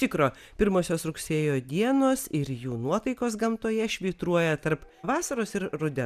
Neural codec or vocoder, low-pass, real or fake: none; 14.4 kHz; real